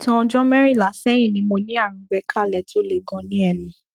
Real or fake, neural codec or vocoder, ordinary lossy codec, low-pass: fake; codec, 44.1 kHz, 7.8 kbps, Pupu-Codec; Opus, 32 kbps; 19.8 kHz